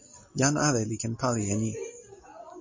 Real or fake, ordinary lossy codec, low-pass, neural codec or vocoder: real; MP3, 32 kbps; 7.2 kHz; none